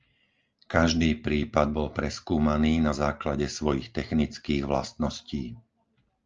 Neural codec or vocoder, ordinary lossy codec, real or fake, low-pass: none; Opus, 24 kbps; real; 7.2 kHz